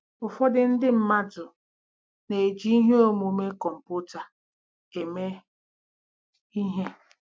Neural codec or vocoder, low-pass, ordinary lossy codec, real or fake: none; none; none; real